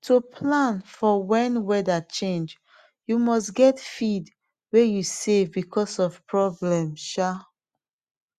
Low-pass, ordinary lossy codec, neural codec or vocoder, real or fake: 14.4 kHz; Opus, 64 kbps; none; real